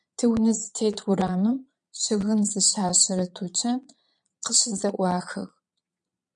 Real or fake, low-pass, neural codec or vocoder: fake; 9.9 kHz; vocoder, 22.05 kHz, 80 mel bands, Vocos